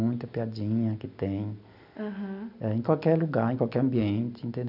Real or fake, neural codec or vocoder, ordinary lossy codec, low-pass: real; none; none; 5.4 kHz